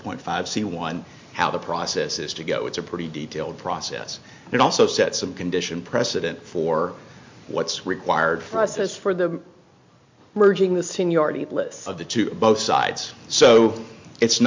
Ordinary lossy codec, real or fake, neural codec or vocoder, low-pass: MP3, 48 kbps; real; none; 7.2 kHz